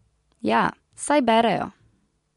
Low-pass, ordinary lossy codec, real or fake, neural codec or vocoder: 10.8 kHz; MP3, 64 kbps; real; none